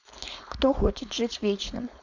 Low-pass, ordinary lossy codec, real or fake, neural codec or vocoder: 7.2 kHz; none; fake; codec, 16 kHz, 4.8 kbps, FACodec